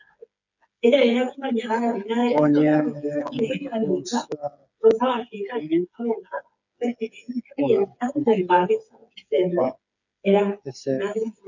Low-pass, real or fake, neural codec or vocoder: 7.2 kHz; fake; codec, 16 kHz, 8 kbps, FreqCodec, smaller model